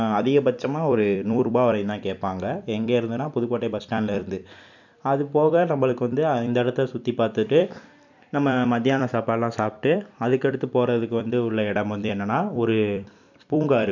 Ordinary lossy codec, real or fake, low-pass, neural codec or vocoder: none; fake; 7.2 kHz; vocoder, 44.1 kHz, 128 mel bands every 256 samples, BigVGAN v2